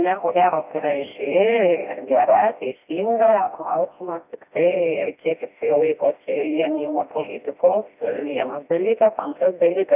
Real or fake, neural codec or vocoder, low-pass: fake; codec, 16 kHz, 1 kbps, FreqCodec, smaller model; 3.6 kHz